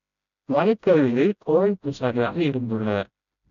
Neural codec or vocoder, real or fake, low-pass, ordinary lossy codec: codec, 16 kHz, 0.5 kbps, FreqCodec, smaller model; fake; 7.2 kHz; none